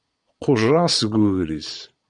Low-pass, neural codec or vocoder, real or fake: 9.9 kHz; vocoder, 22.05 kHz, 80 mel bands, Vocos; fake